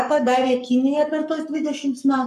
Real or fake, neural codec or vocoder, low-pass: fake; codec, 44.1 kHz, 7.8 kbps, Pupu-Codec; 14.4 kHz